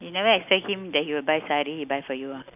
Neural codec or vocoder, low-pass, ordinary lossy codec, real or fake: none; 3.6 kHz; none; real